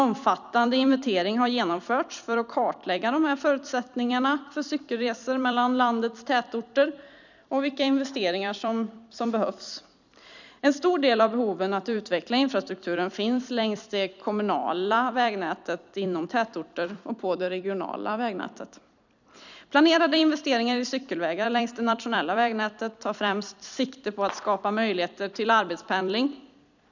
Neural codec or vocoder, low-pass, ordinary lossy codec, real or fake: vocoder, 44.1 kHz, 128 mel bands every 256 samples, BigVGAN v2; 7.2 kHz; none; fake